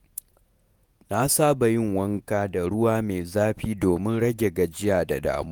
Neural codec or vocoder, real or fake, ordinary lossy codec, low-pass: vocoder, 48 kHz, 128 mel bands, Vocos; fake; none; none